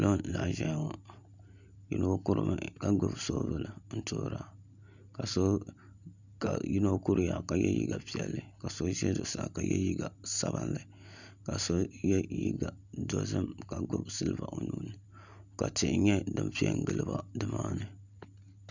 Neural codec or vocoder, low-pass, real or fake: none; 7.2 kHz; real